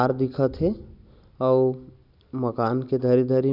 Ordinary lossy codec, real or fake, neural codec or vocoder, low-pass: none; real; none; 5.4 kHz